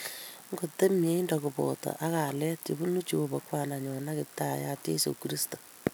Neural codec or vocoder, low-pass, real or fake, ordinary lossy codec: none; none; real; none